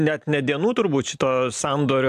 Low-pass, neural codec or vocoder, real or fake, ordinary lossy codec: 14.4 kHz; none; real; AAC, 96 kbps